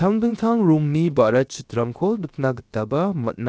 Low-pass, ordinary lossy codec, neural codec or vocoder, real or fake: none; none; codec, 16 kHz, 0.7 kbps, FocalCodec; fake